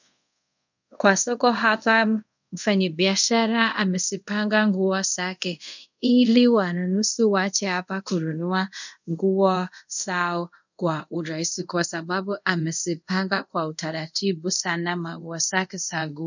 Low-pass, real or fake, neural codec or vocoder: 7.2 kHz; fake; codec, 24 kHz, 0.5 kbps, DualCodec